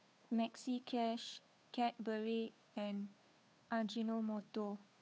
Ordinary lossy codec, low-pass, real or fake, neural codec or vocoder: none; none; fake; codec, 16 kHz, 2 kbps, FunCodec, trained on Chinese and English, 25 frames a second